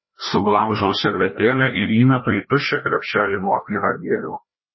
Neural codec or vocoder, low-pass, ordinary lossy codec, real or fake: codec, 16 kHz, 1 kbps, FreqCodec, larger model; 7.2 kHz; MP3, 24 kbps; fake